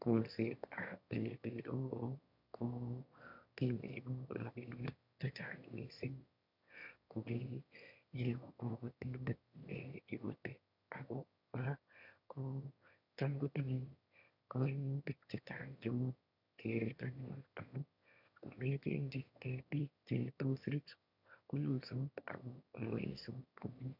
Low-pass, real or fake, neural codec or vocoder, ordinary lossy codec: 5.4 kHz; fake; autoencoder, 22.05 kHz, a latent of 192 numbers a frame, VITS, trained on one speaker; none